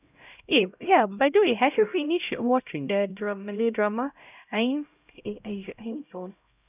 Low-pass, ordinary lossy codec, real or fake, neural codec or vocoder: 3.6 kHz; none; fake; codec, 16 kHz, 0.5 kbps, X-Codec, HuBERT features, trained on LibriSpeech